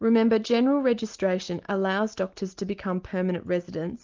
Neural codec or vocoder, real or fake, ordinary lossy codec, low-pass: none; real; Opus, 32 kbps; 7.2 kHz